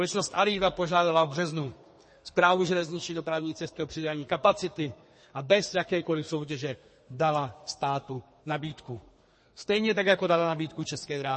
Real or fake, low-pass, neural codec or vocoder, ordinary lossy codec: fake; 10.8 kHz; codec, 32 kHz, 1.9 kbps, SNAC; MP3, 32 kbps